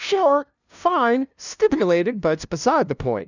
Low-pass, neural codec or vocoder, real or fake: 7.2 kHz; codec, 16 kHz, 0.5 kbps, FunCodec, trained on LibriTTS, 25 frames a second; fake